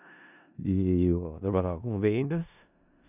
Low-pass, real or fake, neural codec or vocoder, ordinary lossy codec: 3.6 kHz; fake; codec, 16 kHz in and 24 kHz out, 0.4 kbps, LongCat-Audio-Codec, four codebook decoder; none